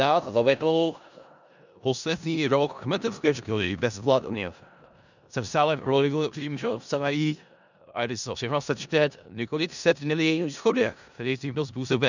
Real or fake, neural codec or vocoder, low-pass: fake; codec, 16 kHz in and 24 kHz out, 0.4 kbps, LongCat-Audio-Codec, four codebook decoder; 7.2 kHz